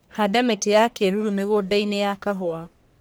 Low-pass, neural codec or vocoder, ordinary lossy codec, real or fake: none; codec, 44.1 kHz, 1.7 kbps, Pupu-Codec; none; fake